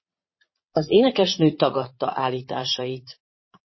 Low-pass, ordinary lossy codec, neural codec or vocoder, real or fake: 7.2 kHz; MP3, 24 kbps; vocoder, 22.05 kHz, 80 mel bands, Vocos; fake